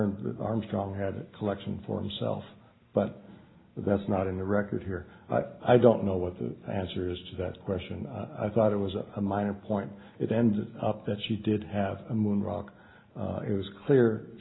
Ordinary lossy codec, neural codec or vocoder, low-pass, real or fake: AAC, 16 kbps; none; 7.2 kHz; real